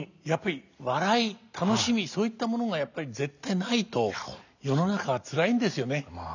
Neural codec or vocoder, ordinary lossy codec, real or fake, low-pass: none; none; real; 7.2 kHz